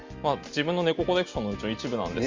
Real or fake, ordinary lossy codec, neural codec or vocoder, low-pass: real; Opus, 32 kbps; none; 7.2 kHz